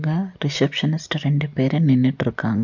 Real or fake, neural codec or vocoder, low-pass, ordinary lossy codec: real; none; 7.2 kHz; none